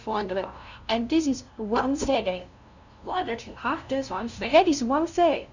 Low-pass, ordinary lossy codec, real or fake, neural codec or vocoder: 7.2 kHz; none; fake; codec, 16 kHz, 0.5 kbps, FunCodec, trained on LibriTTS, 25 frames a second